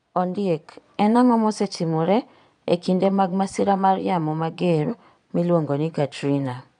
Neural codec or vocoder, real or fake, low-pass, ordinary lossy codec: vocoder, 22.05 kHz, 80 mel bands, Vocos; fake; 9.9 kHz; none